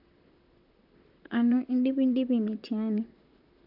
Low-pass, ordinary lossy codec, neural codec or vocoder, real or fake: 5.4 kHz; AAC, 48 kbps; vocoder, 44.1 kHz, 128 mel bands, Pupu-Vocoder; fake